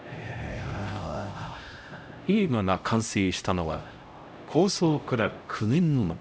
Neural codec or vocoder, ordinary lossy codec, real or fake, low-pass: codec, 16 kHz, 0.5 kbps, X-Codec, HuBERT features, trained on LibriSpeech; none; fake; none